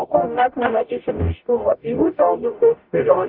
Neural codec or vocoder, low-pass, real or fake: codec, 44.1 kHz, 0.9 kbps, DAC; 5.4 kHz; fake